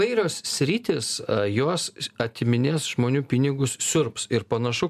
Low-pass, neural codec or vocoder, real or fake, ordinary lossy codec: 14.4 kHz; none; real; MP3, 96 kbps